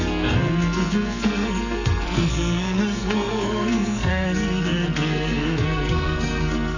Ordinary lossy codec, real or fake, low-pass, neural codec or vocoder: none; fake; 7.2 kHz; codec, 44.1 kHz, 2.6 kbps, SNAC